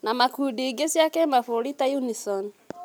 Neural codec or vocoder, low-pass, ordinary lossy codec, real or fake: none; none; none; real